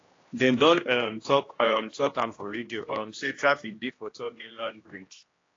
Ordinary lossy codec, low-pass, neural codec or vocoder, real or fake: AAC, 32 kbps; 7.2 kHz; codec, 16 kHz, 1 kbps, X-Codec, HuBERT features, trained on general audio; fake